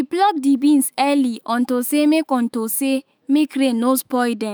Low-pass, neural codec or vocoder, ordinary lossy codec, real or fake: none; autoencoder, 48 kHz, 128 numbers a frame, DAC-VAE, trained on Japanese speech; none; fake